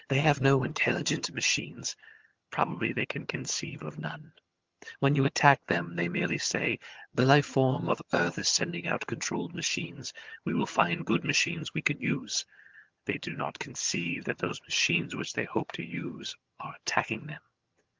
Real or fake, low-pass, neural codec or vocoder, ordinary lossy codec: fake; 7.2 kHz; vocoder, 22.05 kHz, 80 mel bands, HiFi-GAN; Opus, 24 kbps